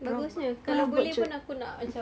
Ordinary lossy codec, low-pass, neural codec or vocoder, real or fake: none; none; none; real